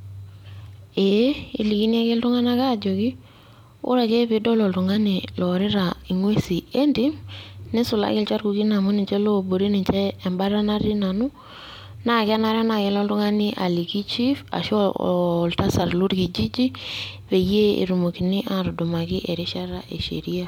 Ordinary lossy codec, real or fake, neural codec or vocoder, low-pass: MP3, 96 kbps; fake; vocoder, 44.1 kHz, 128 mel bands every 256 samples, BigVGAN v2; 19.8 kHz